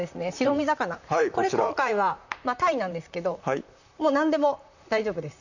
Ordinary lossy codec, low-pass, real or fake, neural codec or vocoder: none; 7.2 kHz; fake; vocoder, 44.1 kHz, 128 mel bands, Pupu-Vocoder